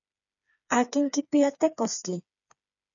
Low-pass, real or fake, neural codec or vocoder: 7.2 kHz; fake; codec, 16 kHz, 4 kbps, FreqCodec, smaller model